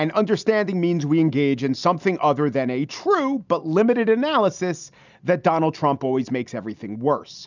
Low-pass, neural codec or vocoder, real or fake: 7.2 kHz; none; real